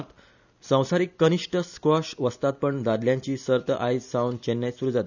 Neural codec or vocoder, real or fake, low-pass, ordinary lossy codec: none; real; 7.2 kHz; none